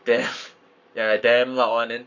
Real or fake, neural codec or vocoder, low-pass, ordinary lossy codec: fake; codec, 44.1 kHz, 7.8 kbps, Pupu-Codec; 7.2 kHz; none